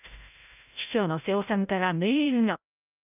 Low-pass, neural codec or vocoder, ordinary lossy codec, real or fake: 3.6 kHz; codec, 16 kHz, 0.5 kbps, FreqCodec, larger model; none; fake